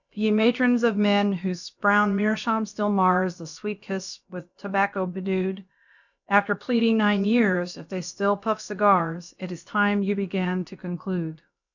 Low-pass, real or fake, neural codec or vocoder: 7.2 kHz; fake; codec, 16 kHz, about 1 kbps, DyCAST, with the encoder's durations